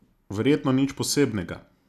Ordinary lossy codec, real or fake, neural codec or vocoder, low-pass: none; real; none; 14.4 kHz